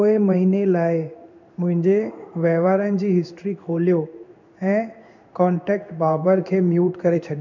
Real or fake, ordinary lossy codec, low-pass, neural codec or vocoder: fake; none; 7.2 kHz; codec, 16 kHz in and 24 kHz out, 1 kbps, XY-Tokenizer